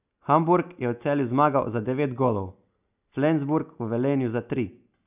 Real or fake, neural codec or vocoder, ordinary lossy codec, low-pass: real; none; none; 3.6 kHz